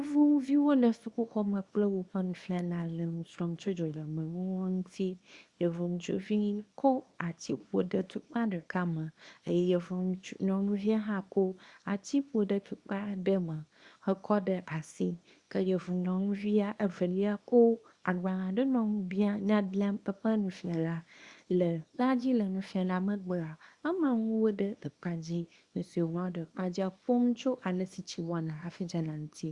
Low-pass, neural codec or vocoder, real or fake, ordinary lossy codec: 10.8 kHz; codec, 24 kHz, 0.9 kbps, WavTokenizer, small release; fake; Opus, 64 kbps